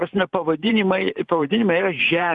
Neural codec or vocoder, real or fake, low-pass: vocoder, 44.1 kHz, 128 mel bands every 512 samples, BigVGAN v2; fake; 10.8 kHz